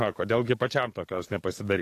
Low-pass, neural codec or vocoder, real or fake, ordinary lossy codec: 14.4 kHz; codec, 44.1 kHz, 7.8 kbps, DAC; fake; AAC, 48 kbps